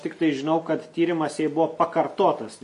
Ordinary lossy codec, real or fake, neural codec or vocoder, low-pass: MP3, 64 kbps; real; none; 10.8 kHz